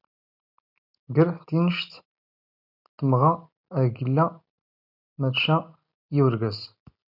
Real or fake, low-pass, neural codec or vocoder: real; 5.4 kHz; none